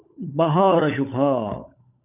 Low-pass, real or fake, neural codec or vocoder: 3.6 kHz; fake; codec, 16 kHz, 16 kbps, FunCodec, trained on LibriTTS, 50 frames a second